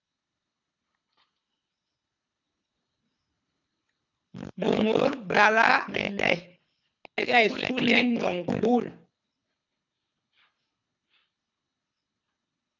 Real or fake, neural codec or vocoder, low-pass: fake; codec, 24 kHz, 3 kbps, HILCodec; 7.2 kHz